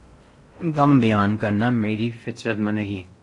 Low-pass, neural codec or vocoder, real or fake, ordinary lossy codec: 10.8 kHz; codec, 16 kHz in and 24 kHz out, 0.6 kbps, FocalCodec, streaming, 4096 codes; fake; AAC, 48 kbps